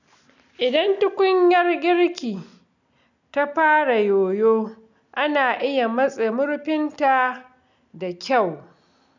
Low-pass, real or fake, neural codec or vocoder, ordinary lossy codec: 7.2 kHz; real; none; none